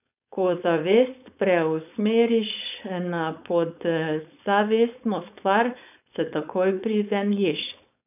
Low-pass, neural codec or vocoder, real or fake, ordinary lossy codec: 3.6 kHz; codec, 16 kHz, 4.8 kbps, FACodec; fake; none